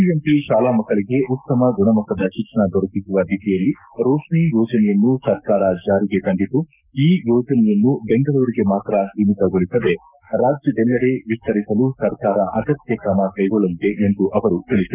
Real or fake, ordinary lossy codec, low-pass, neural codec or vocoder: fake; none; 3.6 kHz; codec, 44.1 kHz, 7.8 kbps, Pupu-Codec